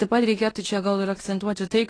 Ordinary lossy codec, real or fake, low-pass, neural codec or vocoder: AAC, 32 kbps; fake; 9.9 kHz; codec, 16 kHz in and 24 kHz out, 0.9 kbps, LongCat-Audio-Codec, fine tuned four codebook decoder